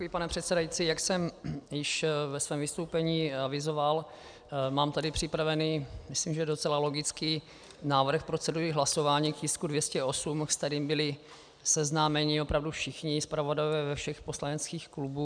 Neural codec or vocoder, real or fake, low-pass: none; real; 9.9 kHz